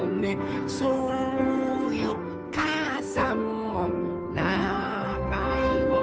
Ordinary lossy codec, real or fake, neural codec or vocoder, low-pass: none; fake; codec, 16 kHz, 2 kbps, FunCodec, trained on Chinese and English, 25 frames a second; none